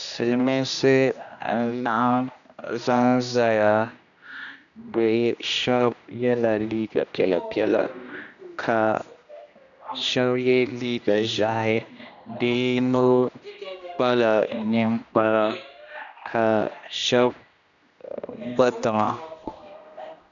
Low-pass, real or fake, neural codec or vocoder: 7.2 kHz; fake; codec, 16 kHz, 1 kbps, X-Codec, HuBERT features, trained on general audio